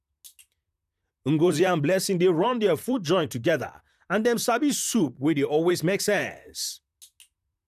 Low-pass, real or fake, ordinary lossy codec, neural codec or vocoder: 14.4 kHz; fake; none; vocoder, 44.1 kHz, 128 mel bands, Pupu-Vocoder